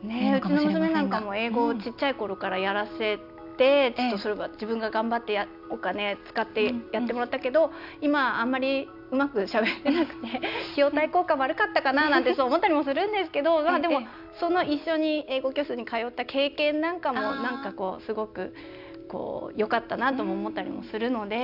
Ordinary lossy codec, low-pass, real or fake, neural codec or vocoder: none; 5.4 kHz; real; none